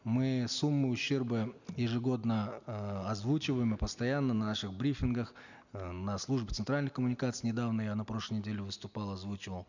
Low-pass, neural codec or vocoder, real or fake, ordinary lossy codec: 7.2 kHz; none; real; none